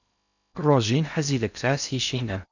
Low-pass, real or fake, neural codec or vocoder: 7.2 kHz; fake; codec, 16 kHz in and 24 kHz out, 0.6 kbps, FocalCodec, streaming, 2048 codes